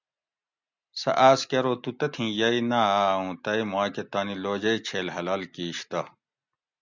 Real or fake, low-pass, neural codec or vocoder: real; 7.2 kHz; none